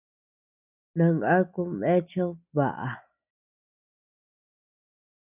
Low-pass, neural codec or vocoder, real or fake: 3.6 kHz; none; real